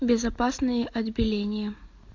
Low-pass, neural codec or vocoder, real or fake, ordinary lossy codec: 7.2 kHz; none; real; AAC, 48 kbps